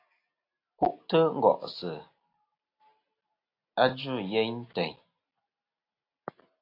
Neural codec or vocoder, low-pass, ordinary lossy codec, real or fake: none; 5.4 kHz; AAC, 32 kbps; real